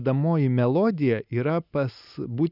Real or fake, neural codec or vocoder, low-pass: real; none; 5.4 kHz